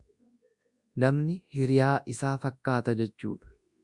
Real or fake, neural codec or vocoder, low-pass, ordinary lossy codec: fake; codec, 24 kHz, 0.9 kbps, WavTokenizer, large speech release; 10.8 kHz; Opus, 64 kbps